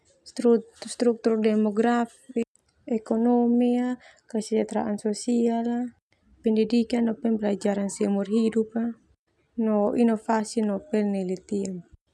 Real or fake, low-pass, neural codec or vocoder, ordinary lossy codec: real; none; none; none